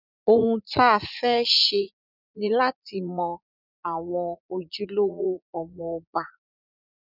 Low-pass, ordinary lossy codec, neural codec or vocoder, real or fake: 5.4 kHz; none; vocoder, 44.1 kHz, 80 mel bands, Vocos; fake